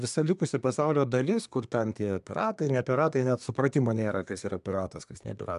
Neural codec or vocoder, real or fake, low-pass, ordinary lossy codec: codec, 24 kHz, 1 kbps, SNAC; fake; 10.8 kHz; MP3, 96 kbps